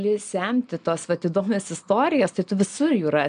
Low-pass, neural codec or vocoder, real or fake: 9.9 kHz; none; real